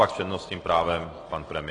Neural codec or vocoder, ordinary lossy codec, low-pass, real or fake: none; AAC, 32 kbps; 9.9 kHz; real